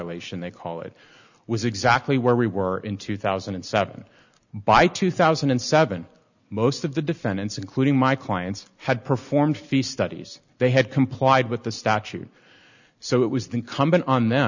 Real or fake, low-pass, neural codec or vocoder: real; 7.2 kHz; none